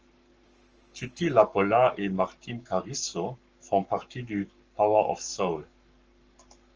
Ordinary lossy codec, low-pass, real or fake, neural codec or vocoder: Opus, 24 kbps; 7.2 kHz; fake; codec, 44.1 kHz, 7.8 kbps, Pupu-Codec